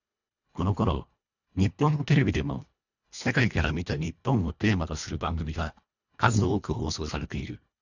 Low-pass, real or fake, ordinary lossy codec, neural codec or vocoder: 7.2 kHz; fake; none; codec, 24 kHz, 1.5 kbps, HILCodec